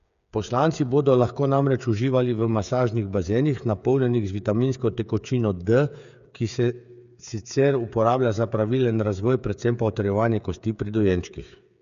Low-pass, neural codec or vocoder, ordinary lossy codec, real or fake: 7.2 kHz; codec, 16 kHz, 8 kbps, FreqCodec, smaller model; none; fake